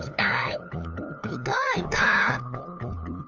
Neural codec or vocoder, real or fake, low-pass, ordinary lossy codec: codec, 16 kHz, 2 kbps, FunCodec, trained on LibriTTS, 25 frames a second; fake; 7.2 kHz; none